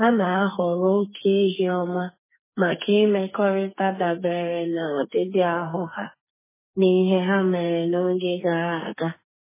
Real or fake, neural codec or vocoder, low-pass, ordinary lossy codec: fake; codec, 44.1 kHz, 2.6 kbps, SNAC; 3.6 kHz; MP3, 16 kbps